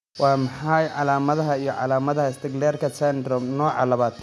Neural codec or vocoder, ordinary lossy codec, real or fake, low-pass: none; none; real; none